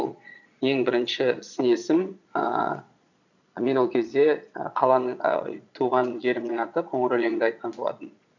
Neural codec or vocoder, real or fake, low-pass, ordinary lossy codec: vocoder, 22.05 kHz, 80 mel bands, Vocos; fake; 7.2 kHz; none